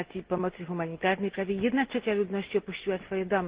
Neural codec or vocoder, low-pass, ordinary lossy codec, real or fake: none; 3.6 kHz; Opus, 16 kbps; real